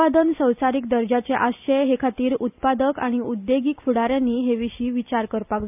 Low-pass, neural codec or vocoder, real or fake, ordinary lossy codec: 3.6 kHz; none; real; none